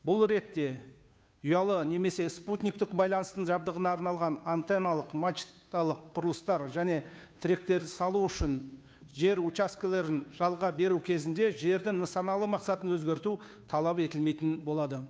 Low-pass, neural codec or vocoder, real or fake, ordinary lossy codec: none; codec, 16 kHz, 2 kbps, FunCodec, trained on Chinese and English, 25 frames a second; fake; none